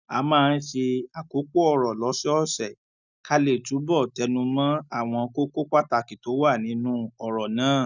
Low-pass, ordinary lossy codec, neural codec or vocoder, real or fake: 7.2 kHz; none; none; real